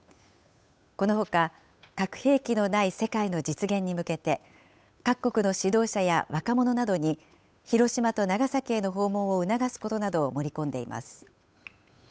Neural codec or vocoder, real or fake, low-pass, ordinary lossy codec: codec, 16 kHz, 8 kbps, FunCodec, trained on Chinese and English, 25 frames a second; fake; none; none